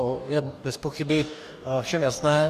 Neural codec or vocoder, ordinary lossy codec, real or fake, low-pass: codec, 44.1 kHz, 2.6 kbps, DAC; Opus, 64 kbps; fake; 14.4 kHz